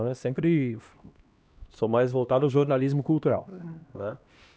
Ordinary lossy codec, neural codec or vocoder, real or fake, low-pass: none; codec, 16 kHz, 1 kbps, X-Codec, HuBERT features, trained on LibriSpeech; fake; none